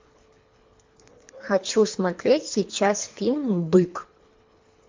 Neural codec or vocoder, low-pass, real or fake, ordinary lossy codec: codec, 24 kHz, 3 kbps, HILCodec; 7.2 kHz; fake; MP3, 48 kbps